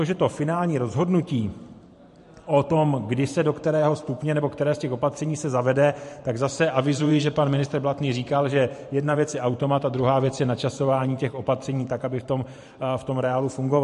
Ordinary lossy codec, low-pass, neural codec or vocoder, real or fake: MP3, 48 kbps; 14.4 kHz; vocoder, 44.1 kHz, 128 mel bands every 512 samples, BigVGAN v2; fake